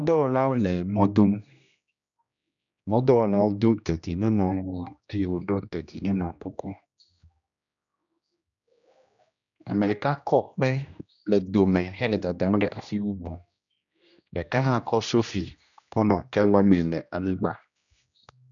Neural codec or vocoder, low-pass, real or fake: codec, 16 kHz, 1 kbps, X-Codec, HuBERT features, trained on general audio; 7.2 kHz; fake